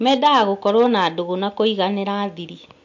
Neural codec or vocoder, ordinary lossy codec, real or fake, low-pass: none; MP3, 48 kbps; real; 7.2 kHz